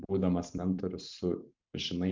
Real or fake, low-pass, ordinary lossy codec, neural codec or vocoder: fake; 7.2 kHz; MP3, 64 kbps; vocoder, 44.1 kHz, 128 mel bands every 512 samples, BigVGAN v2